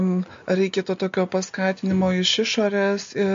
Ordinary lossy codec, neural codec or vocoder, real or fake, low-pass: MP3, 48 kbps; none; real; 7.2 kHz